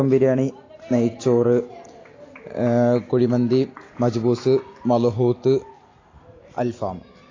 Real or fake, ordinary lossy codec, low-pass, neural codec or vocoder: real; AAC, 32 kbps; 7.2 kHz; none